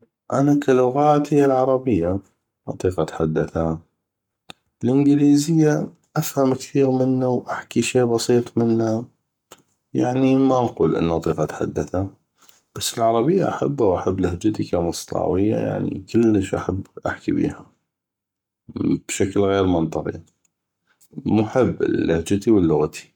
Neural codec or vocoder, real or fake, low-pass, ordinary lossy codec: codec, 44.1 kHz, 7.8 kbps, Pupu-Codec; fake; 19.8 kHz; none